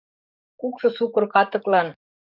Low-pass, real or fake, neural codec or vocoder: 5.4 kHz; fake; codec, 44.1 kHz, 7.8 kbps, DAC